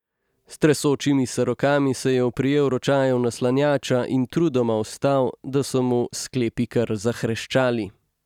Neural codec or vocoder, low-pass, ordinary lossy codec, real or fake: none; 19.8 kHz; none; real